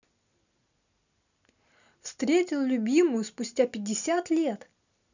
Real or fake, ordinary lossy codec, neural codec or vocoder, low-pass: real; none; none; 7.2 kHz